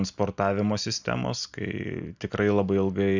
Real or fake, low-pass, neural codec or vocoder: real; 7.2 kHz; none